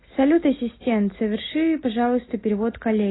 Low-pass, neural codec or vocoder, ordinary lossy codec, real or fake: 7.2 kHz; none; AAC, 16 kbps; real